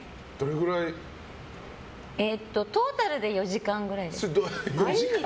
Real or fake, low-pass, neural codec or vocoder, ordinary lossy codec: real; none; none; none